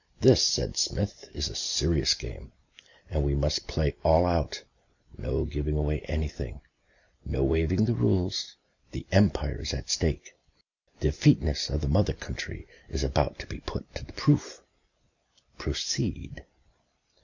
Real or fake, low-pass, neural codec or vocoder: real; 7.2 kHz; none